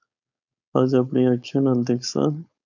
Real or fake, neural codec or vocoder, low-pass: fake; codec, 16 kHz, 4.8 kbps, FACodec; 7.2 kHz